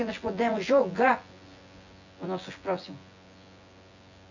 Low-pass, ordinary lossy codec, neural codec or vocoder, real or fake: 7.2 kHz; none; vocoder, 24 kHz, 100 mel bands, Vocos; fake